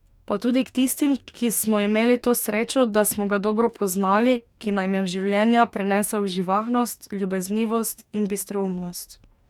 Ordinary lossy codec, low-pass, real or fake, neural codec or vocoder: none; 19.8 kHz; fake; codec, 44.1 kHz, 2.6 kbps, DAC